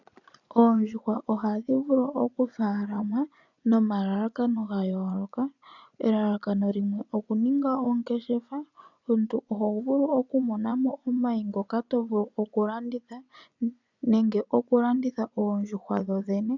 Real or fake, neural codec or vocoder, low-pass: fake; vocoder, 24 kHz, 100 mel bands, Vocos; 7.2 kHz